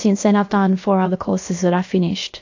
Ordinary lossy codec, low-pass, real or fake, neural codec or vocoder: AAC, 48 kbps; 7.2 kHz; fake; codec, 16 kHz, about 1 kbps, DyCAST, with the encoder's durations